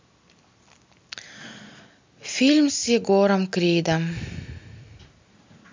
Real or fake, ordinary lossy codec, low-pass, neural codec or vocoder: real; MP3, 64 kbps; 7.2 kHz; none